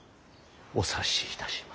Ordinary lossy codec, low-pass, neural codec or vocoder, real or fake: none; none; none; real